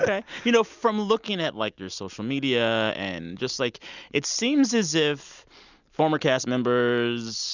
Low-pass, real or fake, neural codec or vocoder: 7.2 kHz; real; none